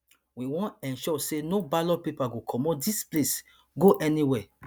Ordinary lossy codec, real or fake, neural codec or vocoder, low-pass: none; real; none; none